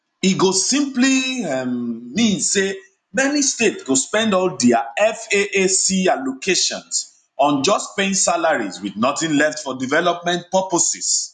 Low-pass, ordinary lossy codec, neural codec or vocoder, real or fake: 10.8 kHz; none; none; real